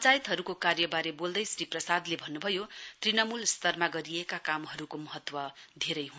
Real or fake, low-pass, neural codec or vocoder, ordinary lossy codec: real; none; none; none